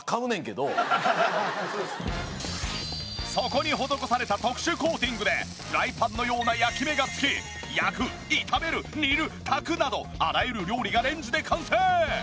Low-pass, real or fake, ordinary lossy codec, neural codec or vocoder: none; real; none; none